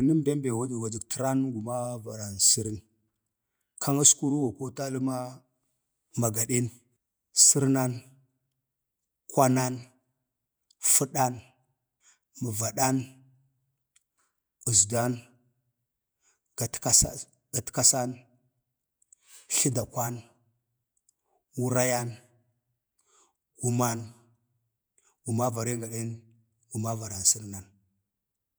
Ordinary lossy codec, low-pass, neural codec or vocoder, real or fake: none; none; vocoder, 48 kHz, 128 mel bands, Vocos; fake